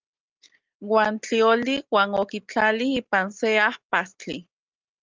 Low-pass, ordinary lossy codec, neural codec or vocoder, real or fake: 7.2 kHz; Opus, 32 kbps; none; real